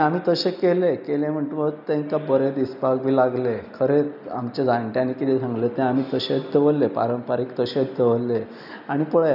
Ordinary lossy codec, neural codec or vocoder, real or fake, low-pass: none; none; real; 5.4 kHz